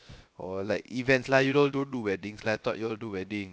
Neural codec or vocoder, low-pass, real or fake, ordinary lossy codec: codec, 16 kHz, 0.7 kbps, FocalCodec; none; fake; none